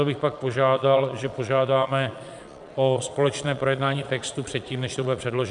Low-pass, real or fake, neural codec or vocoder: 9.9 kHz; fake; vocoder, 22.05 kHz, 80 mel bands, Vocos